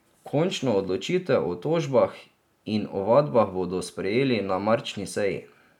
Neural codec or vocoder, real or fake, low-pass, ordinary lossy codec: none; real; 19.8 kHz; none